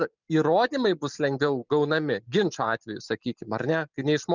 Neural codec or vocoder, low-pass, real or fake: none; 7.2 kHz; real